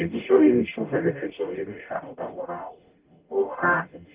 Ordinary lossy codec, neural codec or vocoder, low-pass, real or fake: Opus, 32 kbps; codec, 44.1 kHz, 0.9 kbps, DAC; 3.6 kHz; fake